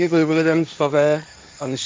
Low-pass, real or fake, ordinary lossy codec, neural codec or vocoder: none; fake; none; codec, 16 kHz, 1.1 kbps, Voila-Tokenizer